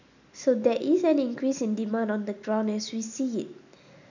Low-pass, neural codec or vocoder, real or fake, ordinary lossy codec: 7.2 kHz; none; real; none